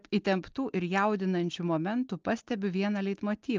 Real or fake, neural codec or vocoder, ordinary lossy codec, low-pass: real; none; Opus, 24 kbps; 7.2 kHz